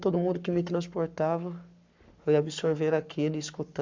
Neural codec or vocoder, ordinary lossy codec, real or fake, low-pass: codec, 44.1 kHz, 7.8 kbps, Pupu-Codec; MP3, 64 kbps; fake; 7.2 kHz